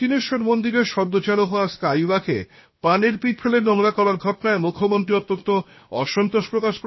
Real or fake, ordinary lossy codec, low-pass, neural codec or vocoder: fake; MP3, 24 kbps; 7.2 kHz; codec, 16 kHz, 0.7 kbps, FocalCodec